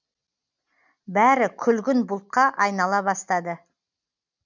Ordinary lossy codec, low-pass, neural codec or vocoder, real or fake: none; 7.2 kHz; none; real